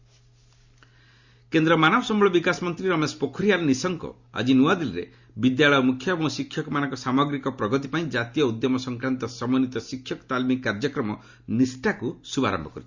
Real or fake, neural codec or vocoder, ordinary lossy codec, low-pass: real; none; Opus, 64 kbps; 7.2 kHz